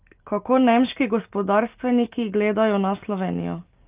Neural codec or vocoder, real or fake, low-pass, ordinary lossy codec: none; real; 3.6 kHz; Opus, 24 kbps